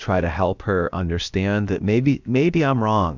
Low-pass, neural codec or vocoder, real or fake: 7.2 kHz; codec, 16 kHz, about 1 kbps, DyCAST, with the encoder's durations; fake